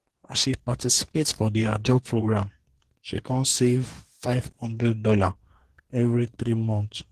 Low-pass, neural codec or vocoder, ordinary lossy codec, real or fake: 14.4 kHz; codec, 44.1 kHz, 2.6 kbps, DAC; Opus, 16 kbps; fake